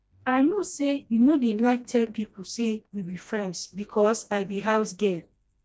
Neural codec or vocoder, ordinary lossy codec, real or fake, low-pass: codec, 16 kHz, 1 kbps, FreqCodec, smaller model; none; fake; none